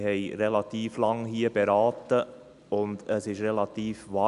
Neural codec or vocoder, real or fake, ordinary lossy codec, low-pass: none; real; none; 10.8 kHz